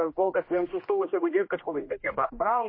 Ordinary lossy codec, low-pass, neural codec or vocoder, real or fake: MP3, 24 kbps; 5.4 kHz; codec, 16 kHz, 1 kbps, X-Codec, HuBERT features, trained on general audio; fake